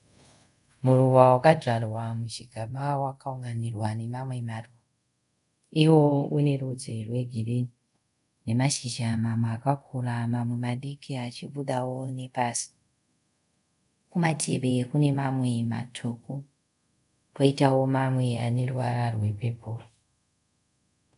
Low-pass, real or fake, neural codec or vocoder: 10.8 kHz; fake; codec, 24 kHz, 0.5 kbps, DualCodec